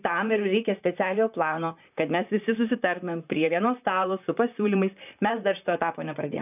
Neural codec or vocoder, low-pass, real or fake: vocoder, 44.1 kHz, 128 mel bands, Pupu-Vocoder; 3.6 kHz; fake